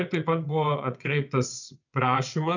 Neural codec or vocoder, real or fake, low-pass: vocoder, 22.05 kHz, 80 mel bands, WaveNeXt; fake; 7.2 kHz